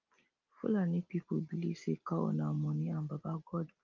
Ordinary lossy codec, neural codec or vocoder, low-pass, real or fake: Opus, 24 kbps; none; 7.2 kHz; real